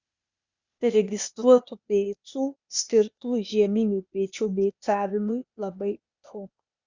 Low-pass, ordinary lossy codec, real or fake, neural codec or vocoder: 7.2 kHz; Opus, 64 kbps; fake; codec, 16 kHz, 0.8 kbps, ZipCodec